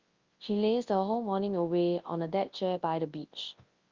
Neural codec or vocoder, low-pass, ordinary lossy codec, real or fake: codec, 24 kHz, 0.9 kbps, WavTokenizer, large speech release; 7.2 kHz; Opus, 32 kbps; fake